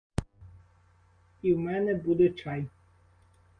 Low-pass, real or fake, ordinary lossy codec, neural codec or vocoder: 9.9 kHz; real; Opus, 32 kbps; none